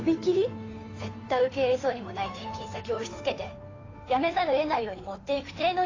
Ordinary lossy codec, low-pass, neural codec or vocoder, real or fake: AAC, 32 kbps; 7.2 kHz; codec, 16 kHz, 2 kbps, FunCodec, trained on Chinese and English, 25 frames a second; fake